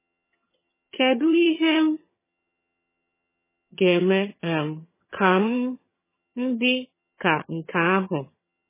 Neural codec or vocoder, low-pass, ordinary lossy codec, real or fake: vocoder, 22.05 kHz, 80 mel bands, HiFi-GAN; 3.6 kHz; MP3, 16 kbps; fake